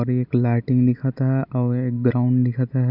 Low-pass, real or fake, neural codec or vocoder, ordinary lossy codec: 5.4 kHz; real; none; none